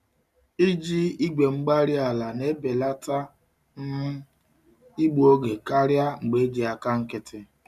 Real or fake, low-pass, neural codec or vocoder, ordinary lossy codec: real; 14.4 kHz; none; none